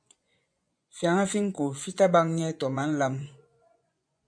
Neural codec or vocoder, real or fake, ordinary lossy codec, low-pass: vocoder, 24 kHz, 100 mel bands, Vocos; fake; MP3, 96 kbps; 9.9 kHz